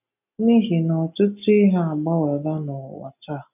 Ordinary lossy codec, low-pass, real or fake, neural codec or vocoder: none; 3.6 kHz; real; none